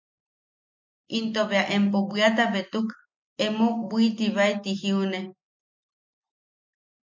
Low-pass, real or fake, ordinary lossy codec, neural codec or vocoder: 7.2 kHz; real; MP3, 48 kbps; none